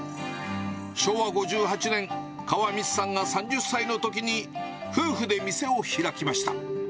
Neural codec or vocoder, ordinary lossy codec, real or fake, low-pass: none; none; real; none